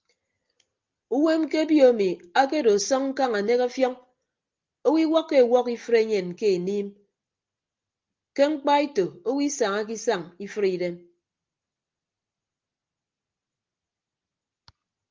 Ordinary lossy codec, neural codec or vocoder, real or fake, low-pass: Opus, 32 kbps; none; real; 7.2 kHz